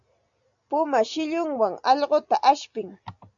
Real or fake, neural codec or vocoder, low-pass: real; none; 7.2 kHz